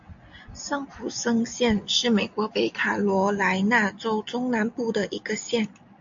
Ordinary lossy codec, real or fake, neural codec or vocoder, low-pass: AAC, 64 kbps; real; none; 7.2 kHz